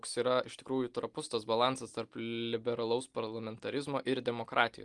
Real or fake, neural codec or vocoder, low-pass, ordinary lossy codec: real; none; 10.8 kHz; Opus, 24 kbps